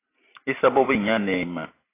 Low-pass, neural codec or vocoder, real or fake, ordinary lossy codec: 3.6 kHz; none; real; AAC, 16 kbps